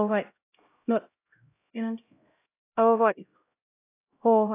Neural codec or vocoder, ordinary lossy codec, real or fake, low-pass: codec, 16 kHz, 0.5 kbps, X-Codec, HuBERT features, trained on LibriSpeech; AAC, 24 kbps; fake; 3.6 kHz